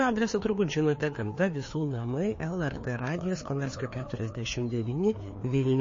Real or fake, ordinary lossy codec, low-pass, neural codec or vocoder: fake; MP3, 32 kbps; 7.2 kHz; codec, 16 kHz, 2 kbps, FreqCodec, larger model